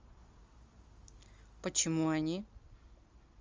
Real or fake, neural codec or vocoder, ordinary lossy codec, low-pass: real; none; Opus, 32 kbps; 7.2 kHz